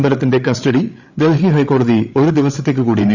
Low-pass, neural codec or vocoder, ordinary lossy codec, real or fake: 7.2 kHz; codec, 16 kHz, 8 kbps, FreqCodec, larger model; none; fake